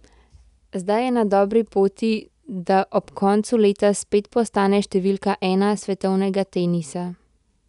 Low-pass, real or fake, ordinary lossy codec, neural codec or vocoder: 10.8 kHz; real; none; none